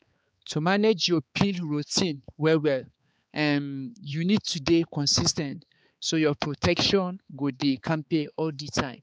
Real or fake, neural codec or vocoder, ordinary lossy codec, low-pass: fake; codec, 16 kHz, 4 kbps, X-Codec, HuBERT features, trained on balanced general audio; none; none